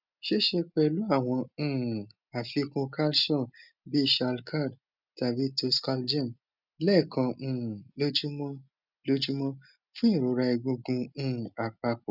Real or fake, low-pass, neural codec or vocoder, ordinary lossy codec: real; 5.4 kHz; none; none